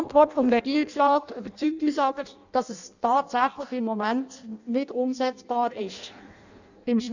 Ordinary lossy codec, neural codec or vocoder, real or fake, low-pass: none; codec, 16 kHz in and 24 kHz out, 0.6 kbps, FireRedTTS-2 codec; fake; 7.2 kHz